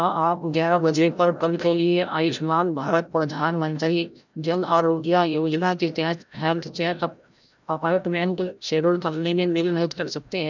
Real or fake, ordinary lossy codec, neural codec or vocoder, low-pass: fake; none; codec, 16 kHz, 0.5 kbps, FreqCodec, larger model; 7.2 kHz